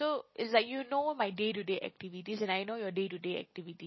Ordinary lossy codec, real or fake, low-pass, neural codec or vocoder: MP3, 24 kbps; real; 7.2 kHz; none